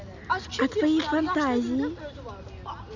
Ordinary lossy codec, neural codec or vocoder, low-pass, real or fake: none; none; 7.2 kHz; real